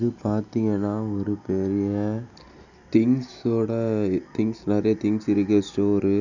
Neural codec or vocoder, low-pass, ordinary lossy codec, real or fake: none; 7.2 kHz; none; real